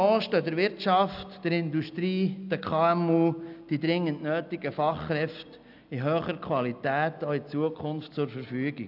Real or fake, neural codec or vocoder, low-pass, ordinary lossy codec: real; none; 5.4 kHz; none